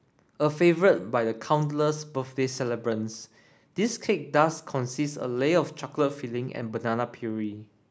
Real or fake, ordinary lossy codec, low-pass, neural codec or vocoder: real; none; none; none